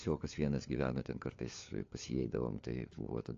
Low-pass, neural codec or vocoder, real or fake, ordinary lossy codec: 7.2 kHz; codec, 16 kHz, 4 kbps, FunCodec, trained on LibriTTS, 50 frames a second; fake; AAC, 48 kbps